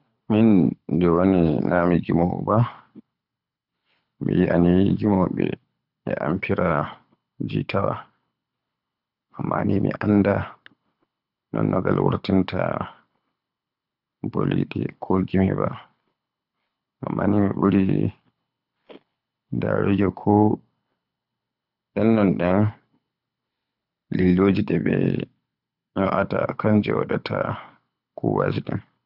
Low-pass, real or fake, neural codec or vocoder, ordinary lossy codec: 5.4 kHz; fake; codec, 24 kHz, 6 kbps, HILCodec; none